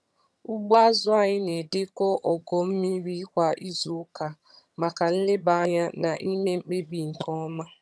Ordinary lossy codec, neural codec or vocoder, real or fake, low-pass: none; vocoder, 22.05 kHz, 80 mel bands, HiFi-GAN; fake; none